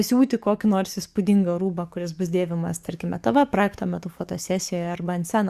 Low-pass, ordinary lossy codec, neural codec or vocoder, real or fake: 14.4 kHz; Opus, 64 kbps; codec, 44.1 kHz, 7.8 kbps, DAC; fake